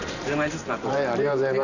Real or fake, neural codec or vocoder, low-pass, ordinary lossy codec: fake; vocoder, 44.1 kHz, 128 mel bands every 512 samples, BigVGAN v2; 7.2 kHz; none